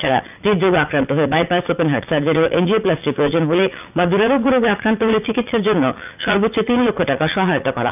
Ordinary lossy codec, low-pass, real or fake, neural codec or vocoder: none; 3.6 kHz; fake; vocoder, 44.1 kHz, 80 mel bands, Vocos